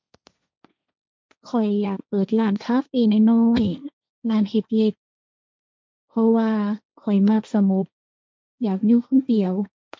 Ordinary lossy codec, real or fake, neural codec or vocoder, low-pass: none; fake; codec, 16 kHz, 1.1 kbps, Voila-Tokenizer; none